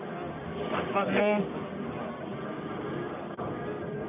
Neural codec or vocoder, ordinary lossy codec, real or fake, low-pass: codec, 44.1 kHz, 1.7 kbps, Pupu-Codec; none; fake; 3.6 kHz